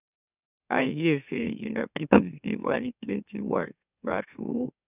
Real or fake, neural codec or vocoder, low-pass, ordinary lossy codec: fake; autoencoder, 44.1 kHz, a latent of 192 numbers a frame, MeloTTS; 3.6 kHz; none